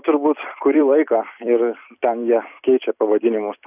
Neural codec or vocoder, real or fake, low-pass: vocoder, 44.1 kHz, 128 mel bands every 256 samples, BigVGAN v2; fake; 3.6 kHz